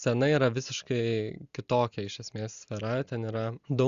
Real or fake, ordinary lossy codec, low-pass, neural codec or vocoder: real; Opus, 64 kbps; 7.2 kHz; none